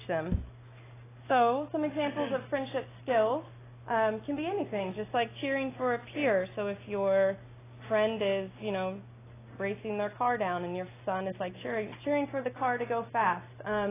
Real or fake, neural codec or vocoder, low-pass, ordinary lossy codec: real; none; 3.6 kHz; AAC, 16 kbps